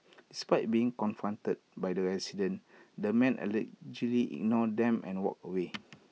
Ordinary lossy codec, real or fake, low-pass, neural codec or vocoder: none; real; none; none